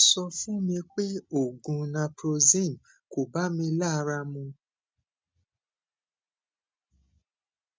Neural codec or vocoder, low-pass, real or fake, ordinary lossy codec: none; none; real; none